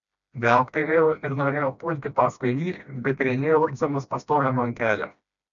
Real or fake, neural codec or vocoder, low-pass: fake; codec, 16 kHz, 1 kbps, FreqCodec, smaller model; 7.2 kHz